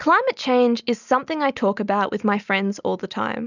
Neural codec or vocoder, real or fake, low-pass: none; real; 7.2 kHz